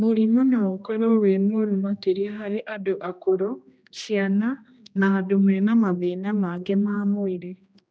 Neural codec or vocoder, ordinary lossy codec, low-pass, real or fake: codec, 16 kHz, 1 kbps, X-Codec, HuBERT features, trained on general audio; none; none; fake